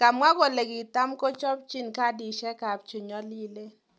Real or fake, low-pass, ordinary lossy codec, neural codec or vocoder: real; none; none; none